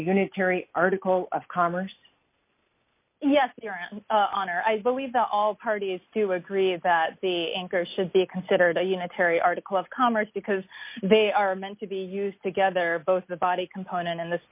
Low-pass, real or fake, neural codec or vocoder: 3.6 kHz; real; none